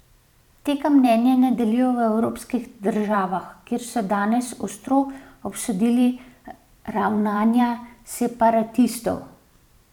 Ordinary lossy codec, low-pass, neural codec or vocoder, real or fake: none; 19.8 kHz; vocoder, 44.1 kHz, 128 mel bands every 512 samples, BigVGAN v2; fake